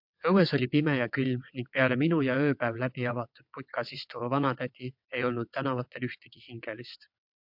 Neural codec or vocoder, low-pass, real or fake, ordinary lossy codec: vocoder, 22.05 kHz, 80 mel bands, WaveNeXt; 5.4 kHz; fake; MP3, 48 kbps